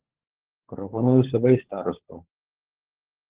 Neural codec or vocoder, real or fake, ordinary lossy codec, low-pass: codec, 16 kHz, 16 kbps, FunCodec, trained on LibriTTS, 50 frames a second; fake; Opus, 16 kbps; 3.6 kHz